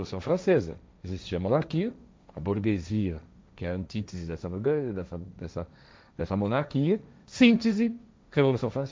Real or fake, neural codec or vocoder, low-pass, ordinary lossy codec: fake; codec, 16 kHz, 1.1 kbps, Voila-Tokenizer; none; none